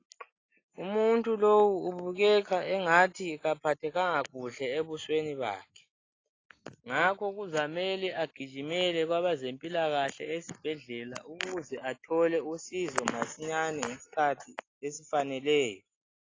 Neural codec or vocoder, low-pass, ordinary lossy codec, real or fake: none; 7.2 kHz; AAC, 32 kbps; real